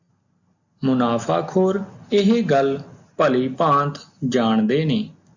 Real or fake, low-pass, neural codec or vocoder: real; 7.2 kHz; none